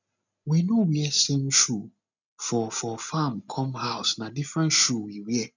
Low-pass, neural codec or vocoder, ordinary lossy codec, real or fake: 7.2 kHz; none; none; real